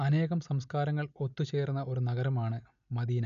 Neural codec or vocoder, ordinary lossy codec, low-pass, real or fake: none; AAC, 96 kbps; 7.2 kHz; real